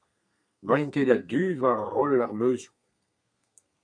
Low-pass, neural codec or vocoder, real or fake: 9.9 kHz; codec, 32 kHz, 1.9 kbps, SNAC; fake